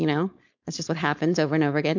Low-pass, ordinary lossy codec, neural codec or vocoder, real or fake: 7.2 kHz; MP3, 64 kbps; codec, 16 kHz, 4.8 kbps, FACodec; fake